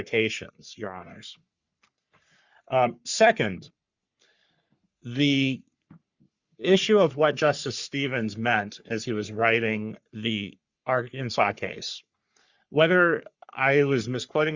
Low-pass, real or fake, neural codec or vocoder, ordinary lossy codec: 7.2 kHz; fake; codec, 44.1 kHz, 3.4 kbps, Pupu-Codec; Opus, 64 kbps